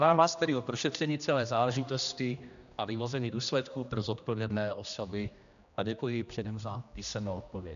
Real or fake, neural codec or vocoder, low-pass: fake; codec, 16 kHz, 1 kbps, X-Codec, HuBERT features, trained on general audio; 7.2 kHz